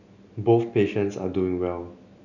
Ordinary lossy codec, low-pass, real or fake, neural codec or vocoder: none; 7.2 kHz; real; none